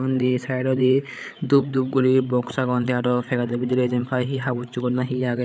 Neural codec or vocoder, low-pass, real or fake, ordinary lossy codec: codec, 16 kHz, 8 kbps, FreqCodec, larger model; none; fake; none